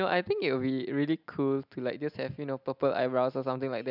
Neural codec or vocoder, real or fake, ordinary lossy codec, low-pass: none; real; none; 5.4 kHz